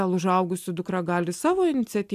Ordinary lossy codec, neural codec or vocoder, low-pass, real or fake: AAC, 96 kbps; none; 14.4 kHz; real